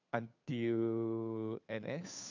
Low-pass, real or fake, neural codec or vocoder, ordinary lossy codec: 7.2 kHz; fake; codec, 16 kHz, 2 kbps, FunCodec, trained on Chinese and English, 25 frames a second; none